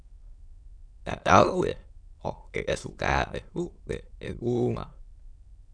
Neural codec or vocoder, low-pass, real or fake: autoencoder, 22.05 kHz, a latent of 192 numbers a frame, VITS, trained on many speakers; 9.9 kHz; fake